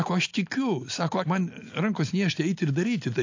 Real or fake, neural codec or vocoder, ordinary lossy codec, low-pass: real; none; AAC, 48 kbps; 7.2 kHz